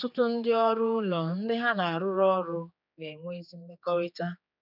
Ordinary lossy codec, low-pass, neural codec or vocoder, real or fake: none; 5.4 kHz; codec, 16 kHz, 4 kbps, X-Codec, HuBERT features, trained on general audio; fake